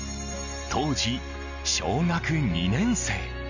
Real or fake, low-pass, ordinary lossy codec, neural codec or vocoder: real; 7.2 kHz; none; none